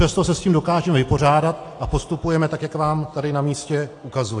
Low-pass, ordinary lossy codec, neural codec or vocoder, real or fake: 10.8 kHz; AAC, 48 kbps; none; real